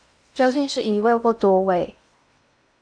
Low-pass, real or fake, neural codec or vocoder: 9.9 kHz; fake; codec, 16 kHz in and 24 kHz out, 0.8 kbps, FocalCodec, streaming, 65536 codes